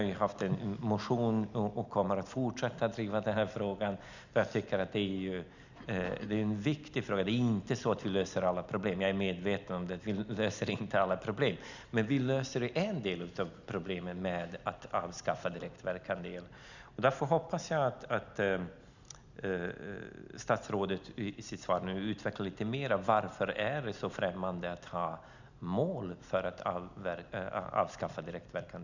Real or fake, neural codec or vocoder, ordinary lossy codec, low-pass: real; none; none; 7.2 kHz